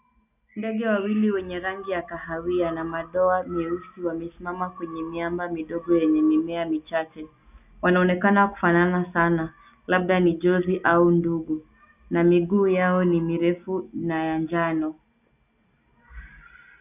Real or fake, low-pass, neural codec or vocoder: real; 3.6 kHz; none